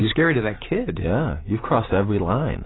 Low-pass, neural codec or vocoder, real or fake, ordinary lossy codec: 7.2 kHz; none; real; AAC, 16 kbps